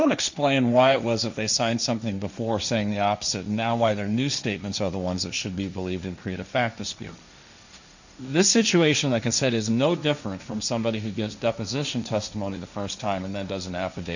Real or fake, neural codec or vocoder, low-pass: fake; codec, 16 kHz, 1.1 kbps, Voila-Tokenizer; 7.2 kHz